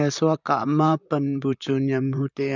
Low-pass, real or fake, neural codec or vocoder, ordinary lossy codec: 7.2 kHz; fake; vocoder, 44.1 kHz, 128 mel bands, Pupu-Vocoder; none